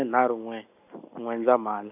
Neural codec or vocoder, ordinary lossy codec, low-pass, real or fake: none; none; 3.6 kHz; real